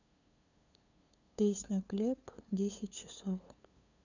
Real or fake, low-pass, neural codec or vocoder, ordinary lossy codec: fake; 7.2 kHz; codec, 16 kHz, 2 kbps, FunCodec, trained on LibriTTS, 25 frames a second; none